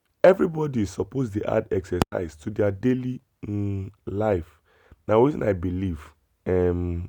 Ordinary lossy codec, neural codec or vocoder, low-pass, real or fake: none; none; 19.8 kHz; real